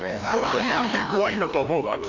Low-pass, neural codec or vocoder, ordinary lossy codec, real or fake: 7.2 kHz; codec, 16 kHz, 1 kbps, FreqCodec, larger model; none; fake